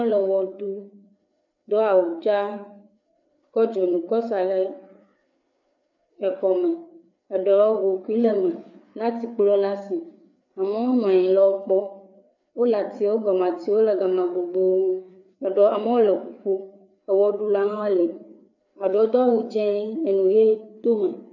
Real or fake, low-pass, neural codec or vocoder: fake; 7.2 kHz; codec, 16 kHz, 4 kbps, FreqCodec, larger model